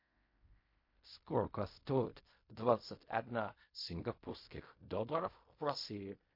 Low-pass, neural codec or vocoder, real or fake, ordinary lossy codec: 5.4 kHz; codec, 16 kHz in and 24 kHz out, 0.4 kbps, LongCat-Audio-Codec, fine tuned four codebook decoder; fake; MP3, 32 kbps